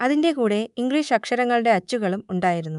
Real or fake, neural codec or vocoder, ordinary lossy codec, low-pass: fake; codec, 24 kHz, 3.1 kbps, DualCodec; none; 10.8 kHz